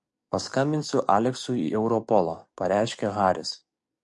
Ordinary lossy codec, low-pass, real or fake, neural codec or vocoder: MP3, 48 kbps; 10.8 kHz; fake; codec, 44.1 kHz, 7.8 kbps, DAC